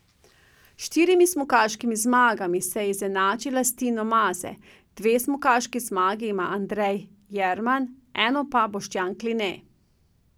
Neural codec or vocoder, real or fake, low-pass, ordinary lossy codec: none; real; none; none